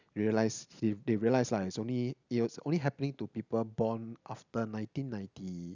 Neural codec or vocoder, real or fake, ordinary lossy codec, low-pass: none; real; none; 7.2 kHz